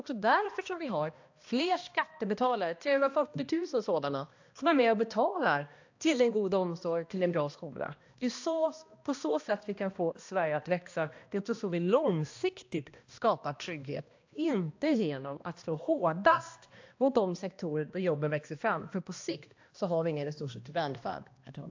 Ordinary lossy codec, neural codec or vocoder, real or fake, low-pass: AAC, 48 kbps; codec, 16 kHz, 1 kbps, X-Codec, HuBERT features, trained on balanced general audio; fake; 7.2 kHz